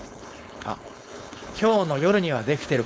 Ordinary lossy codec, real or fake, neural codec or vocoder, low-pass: none; fake; codec, 16 kHz, 4.8 kbps, FACodec; none